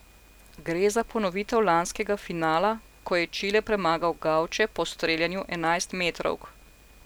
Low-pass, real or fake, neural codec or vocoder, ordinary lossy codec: none; real; none; none